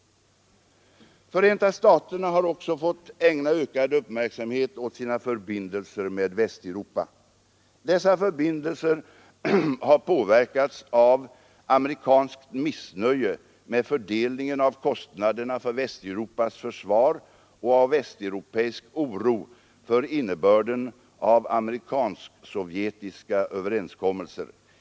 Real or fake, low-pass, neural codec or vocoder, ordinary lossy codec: real; none; none; none